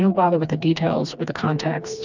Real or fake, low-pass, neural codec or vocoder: fake; 7.2 kHz; codec, 16 kHz, 2 kbps, FreqCodec, smaller model